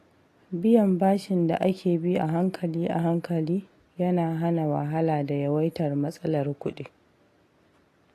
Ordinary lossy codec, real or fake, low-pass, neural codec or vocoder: AAC, 48 kbps; real; 14.4 kHz; none